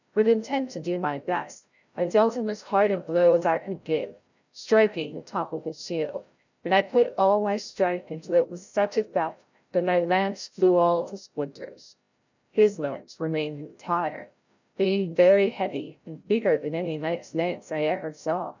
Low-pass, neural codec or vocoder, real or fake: 7.2 kHz; codec, 16 kHz, 0.5 kbps, FreqCodec, larger model; fake